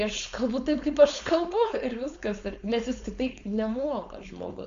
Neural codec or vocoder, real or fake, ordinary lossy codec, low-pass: codec, 16 kHz, 4.8 kbps, FACodec; fake; AAC, 64 kbps; 7.2 kHz